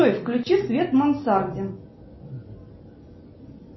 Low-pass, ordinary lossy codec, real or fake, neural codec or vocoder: 7.2 kHz; MP3, 24 kbps; real; none